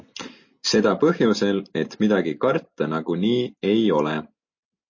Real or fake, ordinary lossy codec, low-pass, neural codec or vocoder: real; MP3, 32 kbps; 7.2 kHz; none